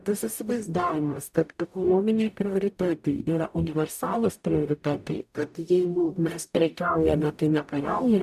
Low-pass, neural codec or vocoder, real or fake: 14.4 kHz; codec, 44.1 kHz, 0.9 kbps, DAC; fake